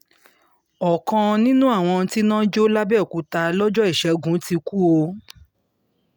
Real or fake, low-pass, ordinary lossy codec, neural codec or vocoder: real; none; none; none